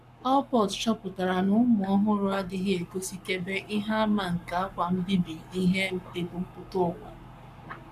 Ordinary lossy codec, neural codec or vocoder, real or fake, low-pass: none; codec, 44.1 kHz, 7.8 kbps, Pupu-Codec; fake; 14.4 kHz